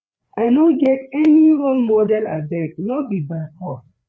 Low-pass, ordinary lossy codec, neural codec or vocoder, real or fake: none; none; codec, 16 kHz, 4 kbps, FreqCodec, larger model; fake